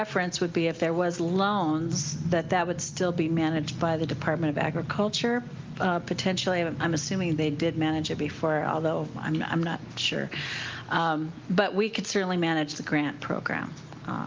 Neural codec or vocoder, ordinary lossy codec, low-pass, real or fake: none; Opus, 32 kbps; 7.2 kHz; real